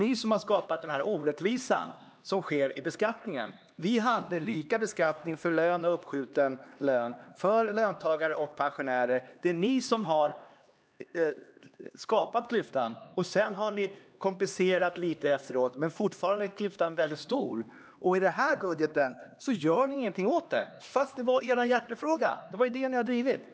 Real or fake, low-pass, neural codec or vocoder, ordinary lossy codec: fake; none; codec, 16 kHz, 2 kbps, X-Codec, HuBERT features, trained on LibriSpeech; none